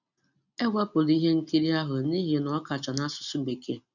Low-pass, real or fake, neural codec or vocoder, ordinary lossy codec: 7.2 kHz; real; none; none